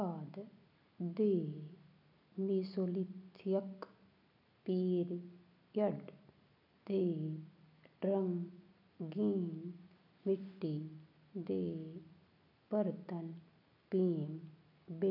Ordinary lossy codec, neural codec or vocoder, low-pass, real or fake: none; none; 5.4 kHz; real